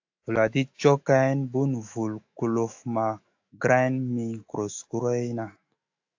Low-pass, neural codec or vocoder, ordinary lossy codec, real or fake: 7.2 kHz; autoencoder, 48 kHz, 128 numbers a frame, DAC-VAE, trained on Japanese speech; AAC, 48 kbps; fake